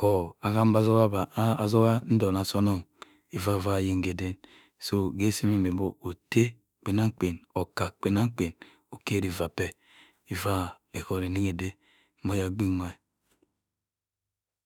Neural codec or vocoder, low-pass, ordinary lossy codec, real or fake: autoencoder, 48 kHz, 32 numbers a frame, DAC-VAE, trained on Japanese speech; 19.8 kHz; none; fake